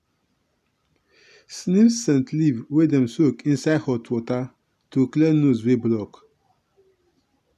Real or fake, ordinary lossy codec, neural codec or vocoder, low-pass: real; none; none; 14.4 kHz